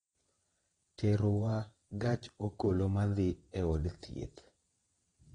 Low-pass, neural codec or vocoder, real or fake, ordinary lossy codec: 9.9 kHz; vocoder, 22.05 kHz, 80 mel bands, WaveNeXt; fake; AAC, 32 kbps